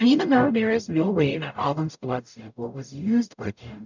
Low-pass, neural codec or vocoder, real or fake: 7.2 kHz; codec, 44.1 kHz, 0.9 kbps, DAC; fake